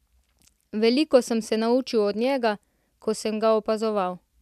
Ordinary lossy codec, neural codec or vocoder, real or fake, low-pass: none; none; real; 14.4 kHz